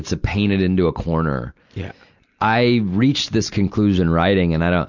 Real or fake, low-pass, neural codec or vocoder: real; 7.2 kHz; none